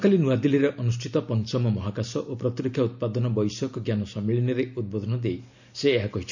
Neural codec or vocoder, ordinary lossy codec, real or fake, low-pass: none; none; real; 7.2 kHz